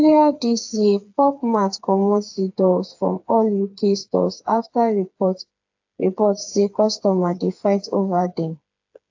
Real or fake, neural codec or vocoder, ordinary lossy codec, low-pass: fake; codec, 16 kHz, 4 kbps, FreqCodec, smaller model; AAC, 48 kbps; 7.2 kHz